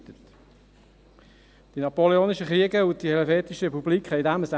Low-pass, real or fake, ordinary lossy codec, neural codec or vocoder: none; real; none; none